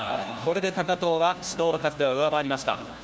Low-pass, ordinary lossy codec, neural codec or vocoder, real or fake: none; none; codec, 16 kHz, 1 kbps, FunCodec, trained on LibriTTS, 50 frames a second; fake